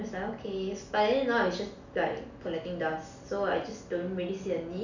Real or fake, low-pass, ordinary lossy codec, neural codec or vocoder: real; 7.2 kHz; none; none